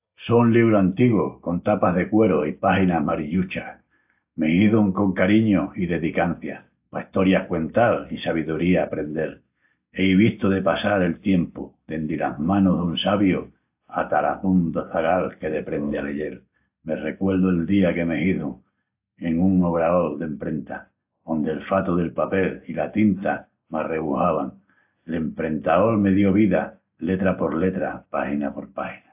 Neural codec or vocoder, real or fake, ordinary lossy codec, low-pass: none; real; AAC, 32 kbps; 3.6 kHz